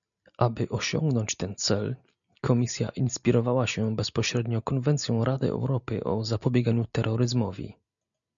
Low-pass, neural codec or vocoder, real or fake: 7.2 kHz; none; real